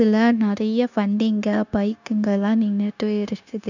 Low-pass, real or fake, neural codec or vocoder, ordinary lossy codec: 7.2 kHz; fake; codec, 16 kHz, 0.9 kbps, LongCat-Audio-Codec; none